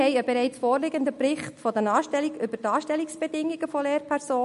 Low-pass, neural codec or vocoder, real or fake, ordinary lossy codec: 14.4 kHz; none; real; MP3, 48 kbps